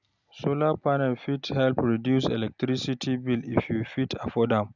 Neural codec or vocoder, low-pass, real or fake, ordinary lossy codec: none; 7.2 kHz; real; none